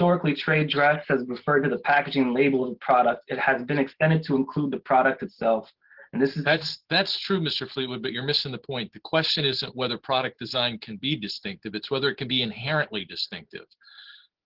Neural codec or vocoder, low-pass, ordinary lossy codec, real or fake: none; 5.4 kHz; Opus, 16 kbps; real